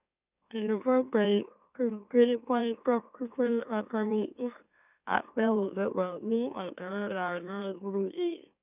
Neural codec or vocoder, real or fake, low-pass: autoencoder, 44.1 kHz, a latent of 192 numbers a frame, MeloTTS; fake; 3.6 kHz